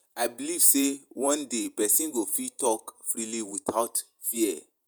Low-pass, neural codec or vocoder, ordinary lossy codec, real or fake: none; vocoder, 48 kHz, 128 mel bands, Vocos; none; fake